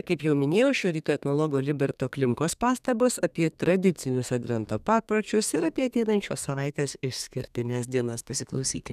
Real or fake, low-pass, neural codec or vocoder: fake; 14.4 kHz; codec, 32 kHz, 1.9 kbps, SNAC